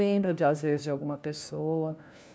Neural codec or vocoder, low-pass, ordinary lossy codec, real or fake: codec, 16 kHz, 1 kbps, FunCodec, trained on LibriTTS, 50 frames a second; none; none; fake